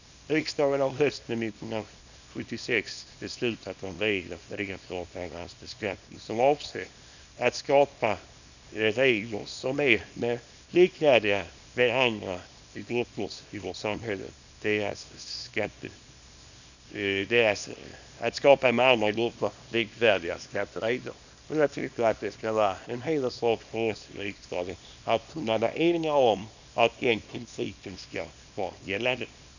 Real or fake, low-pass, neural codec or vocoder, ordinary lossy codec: fake; 7.2 kHz; codec, 24 kHz, 0.9 kbps, WavTokenizer, small release; none